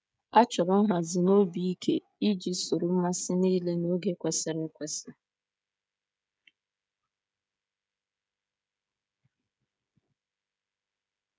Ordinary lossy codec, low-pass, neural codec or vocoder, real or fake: none; none; codec, 16 kHz, 16 kbps, FreqCodec, smaller model; fake